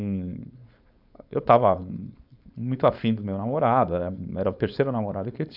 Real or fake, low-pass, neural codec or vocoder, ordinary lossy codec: fake; 5.4 kHz; codec, 16 kHz, 4.8 kbps, FACodec; none